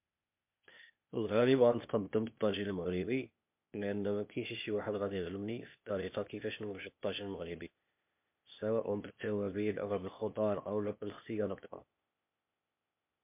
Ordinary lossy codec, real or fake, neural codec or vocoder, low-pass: MP3, 32 kbps; fake; codec, 16 kHz, 0.8 kbps, ZipCodec; 3.6 kHz